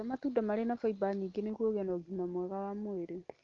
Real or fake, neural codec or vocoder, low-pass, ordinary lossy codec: real; none; 7.2 kHz; Opus, 32 kbps